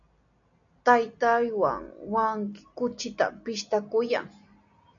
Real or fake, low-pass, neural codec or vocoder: real; 7.2 kHz; none